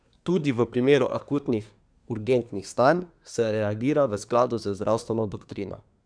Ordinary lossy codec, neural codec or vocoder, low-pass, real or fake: none; codec, 24 kHz, 1 kbps, SNAC; 9.9 kHz; fake